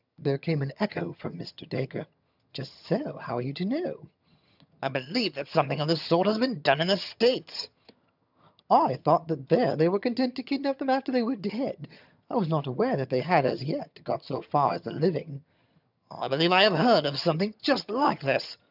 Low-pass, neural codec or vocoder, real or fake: 5.4 kHz; vocoder, 22.05 kHz, 80 mel bands, HiFi-GAN; fake